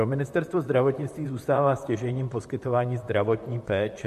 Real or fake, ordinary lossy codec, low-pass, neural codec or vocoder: fake; MP3, 64 kbps; 14.4 kHz; vocoder, 44.1 kHz, 128 mel bands, Pupu-Vocoder